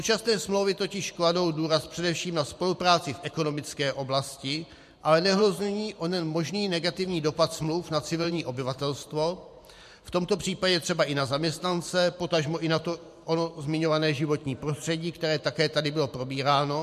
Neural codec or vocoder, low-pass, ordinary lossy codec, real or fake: vocoder, 44.1 kHz, 128 mel bands every 512 samples, BigVGAN v2; 14.4 kHz; MP3, 64 kbps; fake